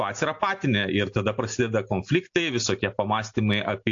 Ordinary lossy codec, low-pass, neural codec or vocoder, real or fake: AAC, 48 kbps; 7.2 kHz; none; real